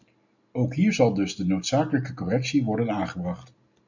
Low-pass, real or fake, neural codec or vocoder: 7.2 kHz; real; none